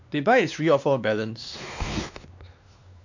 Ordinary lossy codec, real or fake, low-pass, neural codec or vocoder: none; fake; 7.2 kHz; codec, 16 kHz, 2 kbps, X-Codec, WavLM features, trained on Multilingual LibriSpeech